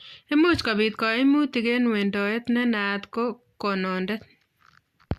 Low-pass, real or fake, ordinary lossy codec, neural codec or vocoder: 14.4 kHz; real; Opus, 64 kbps; none